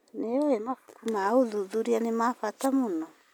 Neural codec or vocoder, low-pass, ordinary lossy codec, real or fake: none; none; none; real